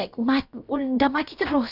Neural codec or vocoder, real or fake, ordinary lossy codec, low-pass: codec, 16 kHz, about 1 kbps, DyCAST, with the encoder's durations; fake; none; 5.4 kHz